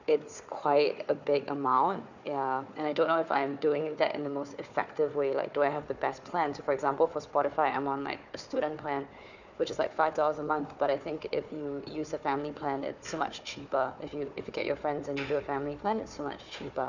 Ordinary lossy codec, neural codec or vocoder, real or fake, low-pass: none; codec, 16 kHz, 4 kbps, FunCodec, trained on Chinese and English, 50 frames a second; fake; 7.2 kHz